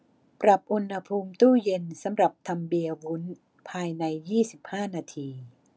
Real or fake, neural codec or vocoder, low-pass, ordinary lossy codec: real; none; none; none